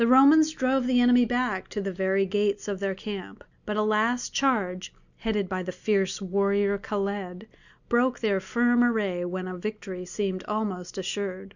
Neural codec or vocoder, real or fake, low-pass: none; real; 7.2 kHz